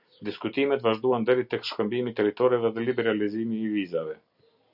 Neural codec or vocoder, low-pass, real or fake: none; 5.4 kHz; real